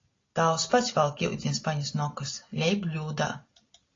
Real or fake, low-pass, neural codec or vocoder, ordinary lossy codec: real; 7.2 kHz; none; AAC, 32 kbps